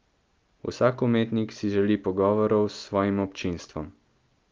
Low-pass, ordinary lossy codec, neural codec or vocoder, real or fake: 7.2 kHz; Opus, 32 kbps; none; real